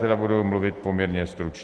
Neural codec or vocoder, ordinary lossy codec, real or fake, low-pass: none; Opus, 16 kbps; real; 10.8 kHz